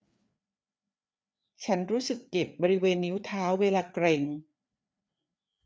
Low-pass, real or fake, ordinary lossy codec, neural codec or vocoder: none; fake; none; codec, 16 kHz, 4 kbps, FreqCodec, larger model